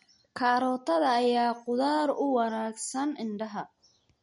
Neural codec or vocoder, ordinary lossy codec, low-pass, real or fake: none; MP3, 48 kbps; 19.8 kHz; real